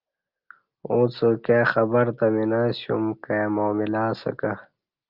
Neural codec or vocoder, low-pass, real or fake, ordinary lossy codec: none; 5.4 kHz; real; Opus, 24 kbps